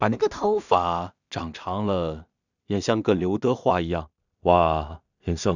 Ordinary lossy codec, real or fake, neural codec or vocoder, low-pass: none; fake; codec, 16 kHz in and 24 kHz out, 0.4 kbps, LongCat-Audio-Codec, two codebook decoder; 7.2 kHz